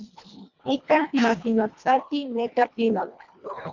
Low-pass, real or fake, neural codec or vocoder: 7.2 kHz; fake; codec, 24 kHz, 1.5 kbps, HILCodec